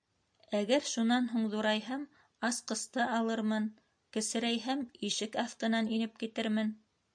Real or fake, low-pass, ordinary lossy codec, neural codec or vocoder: real; 9.9 kHz; MP3, 64 kbps; none